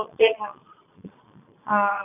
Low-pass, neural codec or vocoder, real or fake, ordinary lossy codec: 3.6 kHz; vocoder, 22.05 kHz, 80 mel bands, Vocos; fake; none